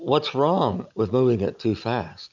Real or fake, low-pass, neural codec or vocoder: real; 7.2 kHz; none